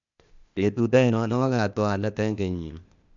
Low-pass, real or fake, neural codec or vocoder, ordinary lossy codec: 7.2 kHz; fake; codec, 16 kHz, 0.8 kbps, ZipCodec; none